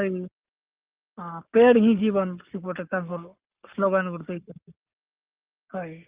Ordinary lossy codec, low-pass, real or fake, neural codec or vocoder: Opus, 32 kbps; 3.6 kHz; fake; codec, 44.1 kHz, 7.8 kbps, Pupu-Codec